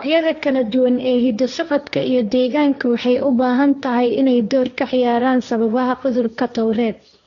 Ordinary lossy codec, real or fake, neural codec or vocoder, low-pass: none; fake; codec, 16 kHz, 1.1 kbps, Voila-Tokenizer; 7.2 kHz